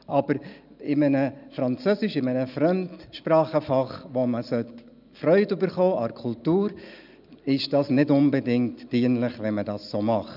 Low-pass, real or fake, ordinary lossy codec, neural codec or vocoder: 5.4 kHz; real; none; none